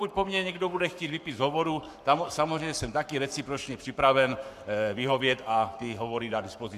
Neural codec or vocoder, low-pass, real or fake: codec, 44.1 kHz, 7.8 kbps, Pupu-Codec; 14.4 kHz; fake